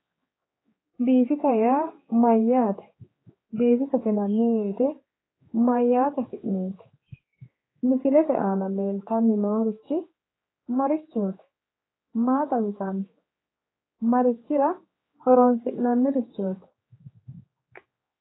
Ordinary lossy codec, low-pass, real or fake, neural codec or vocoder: AAC, 16 kbps; 7.2 kHz; fake; codec, 16 kHz, 4 kbps, X-Codec, HuBERT features, trained on general audio